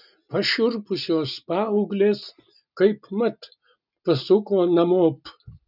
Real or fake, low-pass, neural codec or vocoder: real; 5.4 kHz; none